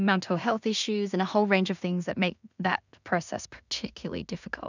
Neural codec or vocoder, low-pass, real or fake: codec, 16 kHz in and 24 kHz out, 0.4 kbps, LongCat-Audio-Codec, two codebook decoder; 7.2 kHz; fake